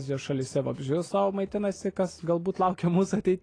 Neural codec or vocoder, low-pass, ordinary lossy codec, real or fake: none; 9.9 kHz; AAC, 32 kbps; real